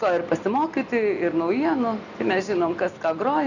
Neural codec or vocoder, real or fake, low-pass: none; real; 7.2 kHz